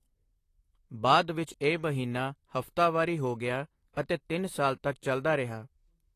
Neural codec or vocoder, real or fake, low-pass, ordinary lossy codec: vocoder, 44.1 kHz, 128 mel bands, Pupu-Vocoder; fake; 14.4 kHz; AAC, 48 kbps